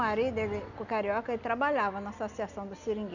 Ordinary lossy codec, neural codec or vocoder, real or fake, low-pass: none; none; real; 7.2 kHz